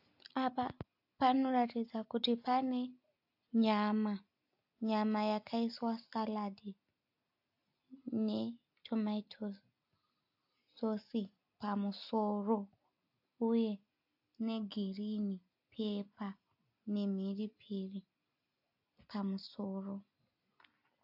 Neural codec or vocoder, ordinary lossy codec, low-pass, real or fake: none; AAC, 48 kbps; 5.4 kHz; real